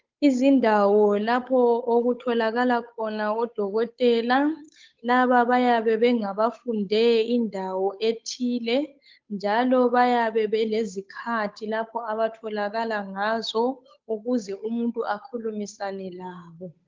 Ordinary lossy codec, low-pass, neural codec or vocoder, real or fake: Opus, 32 kbps; 7.2 kHz; codec, 16 kHz, 8 kbps, FunCodec, trained on Chinese and English, 25 frames a second; fake